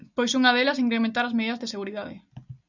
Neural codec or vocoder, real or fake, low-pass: none; real; 7.2 kHz